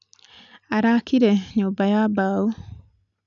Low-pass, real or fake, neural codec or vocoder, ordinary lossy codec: 7.2 kHz; real; none; none